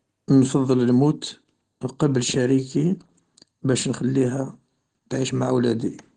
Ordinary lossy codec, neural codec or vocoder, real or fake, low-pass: Opus, 16 kbps; none; real; 10.8 kHz